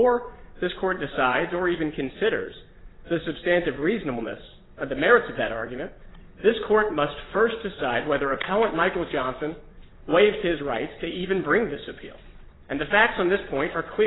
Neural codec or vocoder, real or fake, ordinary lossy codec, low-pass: vocoder, 22.05 kHz, 80 mel bands, WaveNeXt; fake; AAC, 16 kbps; 7.2 kHz